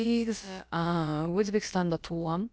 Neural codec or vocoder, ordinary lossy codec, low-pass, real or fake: codec, 16 kHz, about 1 kbps, DyCAST, with the encoder's durations; none; none; fake